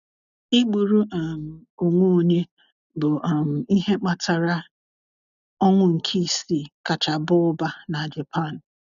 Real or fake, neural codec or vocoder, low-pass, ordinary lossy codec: real; none; 7.2 kHz; none